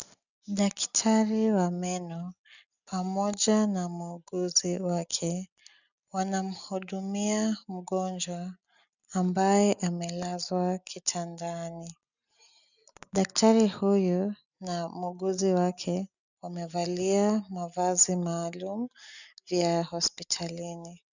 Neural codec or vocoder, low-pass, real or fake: none; 7.2 kHz; real